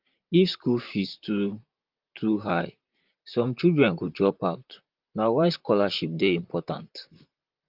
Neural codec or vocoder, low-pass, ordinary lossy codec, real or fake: vocoder, 44.1 kHz, 128 mel bands, Pupu-Vocoder; 5.4 kHz; Opus, 24 kbps; fake